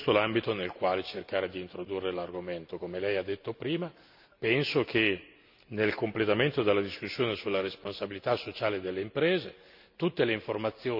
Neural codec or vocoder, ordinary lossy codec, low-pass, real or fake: none; none; 5.4 kHz; real